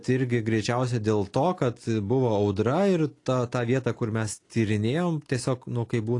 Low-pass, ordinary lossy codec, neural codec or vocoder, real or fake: 10.8 kHz; AAC, 48 kbps; none; real